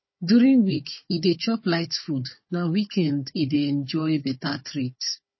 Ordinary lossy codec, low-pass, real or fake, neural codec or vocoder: MP3, 24 kbps; 7.2 kHz; fake; codec, 16 kHz, 4 kbps, FunCodec, trained on Chinese and English, 50 frames a second